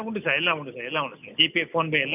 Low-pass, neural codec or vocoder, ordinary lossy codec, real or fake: 3.6 kHz; none; none; real